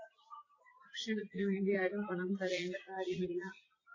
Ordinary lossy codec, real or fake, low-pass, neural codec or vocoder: AAC, 32 kbps; fake; 7.2 kHz; vocoder, 44.1 kHz, 80 mel bands, Vocos